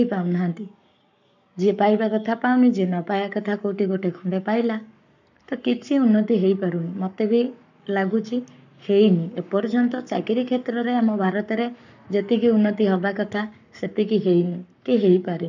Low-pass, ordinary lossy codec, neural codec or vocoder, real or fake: 7.2 kHz; none; codec, 44.1 kHz, 7.8 kbps, Pupu-Codec; fake